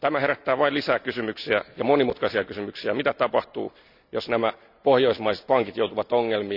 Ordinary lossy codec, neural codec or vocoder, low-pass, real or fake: none; none; 5.4 kHz; real